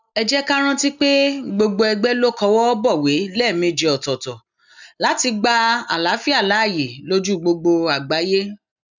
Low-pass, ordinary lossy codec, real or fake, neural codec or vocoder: 7.2 kHz; none; real; none